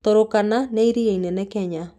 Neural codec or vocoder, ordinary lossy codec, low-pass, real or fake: none; none; 14.4 kHz; real